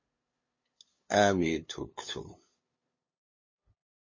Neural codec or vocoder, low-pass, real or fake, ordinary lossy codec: codec, 16 kHz, 2 kbps, FunCodec, trained on LibriTTS, 25 frames a second; 7.2 kHz; fake; MP3, 32 kbps